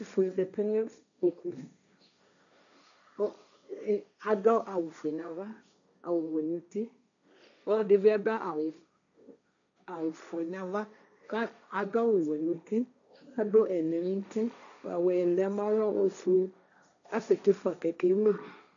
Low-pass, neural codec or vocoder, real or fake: 7.2 kHz; codec, 16 kHz, 1.1 kbps, Voila-Tokenizer; fake